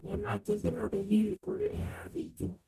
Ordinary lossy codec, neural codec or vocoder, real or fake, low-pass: MP3, 96 kbps; codec, 44.1 kHz, 0.9 kbps, DAC; fake; 14.4 kHz